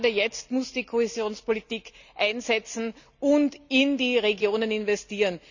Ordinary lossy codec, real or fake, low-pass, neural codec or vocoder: none; real; 7.2 kHz; none